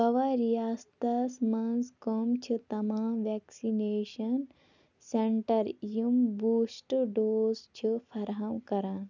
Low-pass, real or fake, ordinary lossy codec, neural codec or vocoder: 7.2 kHz; real; none; none